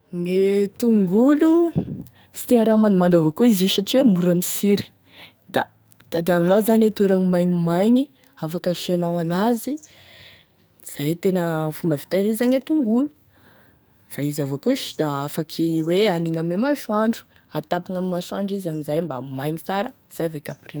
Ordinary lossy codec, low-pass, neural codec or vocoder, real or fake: none; none; codec, 44.1 kHz, 2.6 kbps, SNAC; fake